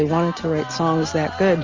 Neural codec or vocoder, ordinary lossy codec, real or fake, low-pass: none; Opus, 32 kbps; real; 7.2 kHz